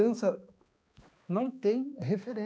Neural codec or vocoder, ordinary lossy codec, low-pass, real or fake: codec, 16 kHz, 2 kbps, X-Codec, HuBERT features, trained on balanced general audio; none; none; fake